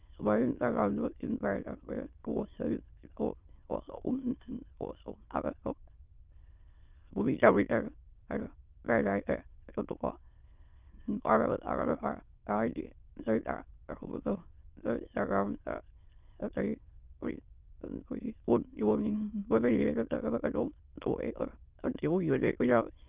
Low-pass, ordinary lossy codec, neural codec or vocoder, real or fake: 3.6 kHz; Opus, 24 kbps; autoencoder, 22.05 kHz, a latent of 192 numbers a frame, VITS, trained on many speakers; fake